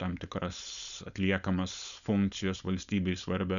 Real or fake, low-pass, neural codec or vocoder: fake; 7.2 kHz; codec, 16 kHz, 4.8 kbps, FACodec